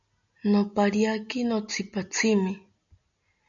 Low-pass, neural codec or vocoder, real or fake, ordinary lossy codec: 7.2 kHz; none; real; MP3, 64 kbps